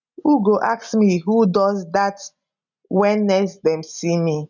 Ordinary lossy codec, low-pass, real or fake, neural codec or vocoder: none; 7.2 kHz; real; none